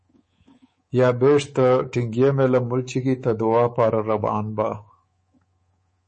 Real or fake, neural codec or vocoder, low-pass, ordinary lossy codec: fake; codec, 24 kHz, 3.1 kbps, DualCodec; 10.8 kHz; MP3, 32 kbps